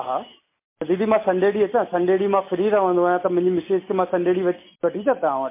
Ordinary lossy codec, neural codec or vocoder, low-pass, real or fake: MP3, 24 kbps; none; 3.6 kHz; real